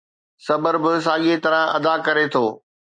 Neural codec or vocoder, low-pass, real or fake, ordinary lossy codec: none; 9.9 kHz; real; MP3, 48 kbps